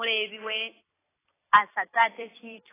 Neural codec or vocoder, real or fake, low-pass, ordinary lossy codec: vocoder, 44.1 kHz, 128 mel bands every 256 samples, BigVGAN v2; fake; 3.6 kHz; AAC, 16 kbps